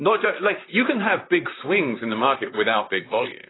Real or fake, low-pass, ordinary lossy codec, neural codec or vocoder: fake; 7.2 kHz; AAC, 16 kbps; vocoder, 44.1 kHz, 128 mel bands, Pupu-Vocoder